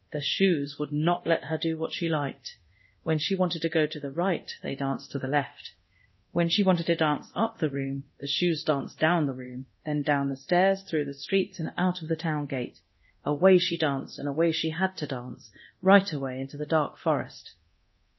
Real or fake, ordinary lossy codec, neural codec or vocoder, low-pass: fake; MP3, 24 kbps; codec, 24 kHz, 0.9 kbps, DualCodec; 7.2 kHz